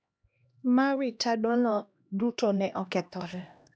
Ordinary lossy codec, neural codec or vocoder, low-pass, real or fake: none; codec, 16 kHz, 1 kbps, X-Codec, HuBERT features, trained on LibriSpeech; none; fake